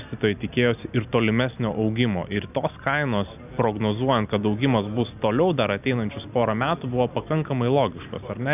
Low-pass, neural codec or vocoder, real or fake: 3.6 kHz; none; real